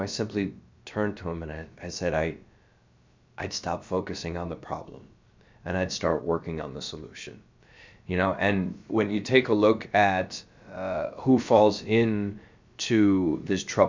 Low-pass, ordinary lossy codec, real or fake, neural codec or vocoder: 7.2 kHz; MP3, 64 kbps; fake; codec, 16 kHz, about 1 kbps, DyCAST, with the encoder's durations